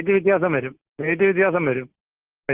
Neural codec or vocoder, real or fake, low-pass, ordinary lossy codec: none; real; 3.6 kHz; Opus, 16 kbps